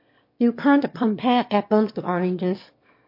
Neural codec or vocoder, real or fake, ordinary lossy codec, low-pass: autoencoder, 22.05 kHz, a latent of 192 numbers a frame, VITS, trained on one speaker; fake; MP3, 32 kbps; 5.4 kHz